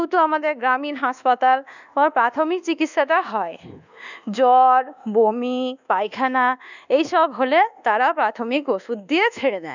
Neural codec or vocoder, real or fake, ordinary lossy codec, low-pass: codec, 24 kHz, 1.2 kbps, DualCodec; fake; none; 7.2 kHz